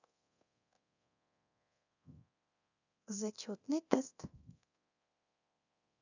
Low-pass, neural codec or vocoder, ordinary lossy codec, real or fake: 7.2 kHz; codec, 24 kHz, 0.9 kbps, DualCodec; none; fake